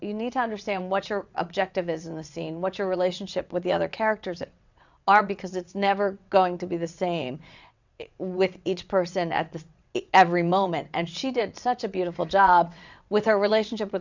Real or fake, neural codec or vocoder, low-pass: fake; vocoder, 22.05 kHz, 80 mel bands, WaveNeXt; 7.2 kHz